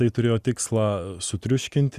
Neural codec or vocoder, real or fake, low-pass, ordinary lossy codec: none; real; 14.4 kHz; Opus, 64 kbps